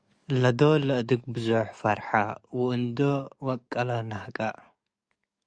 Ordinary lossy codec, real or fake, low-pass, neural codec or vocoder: Opus, 64 kbps; fake; 9.9 kHz; codec, 44.1 kHz, 7.8 kbps, DAC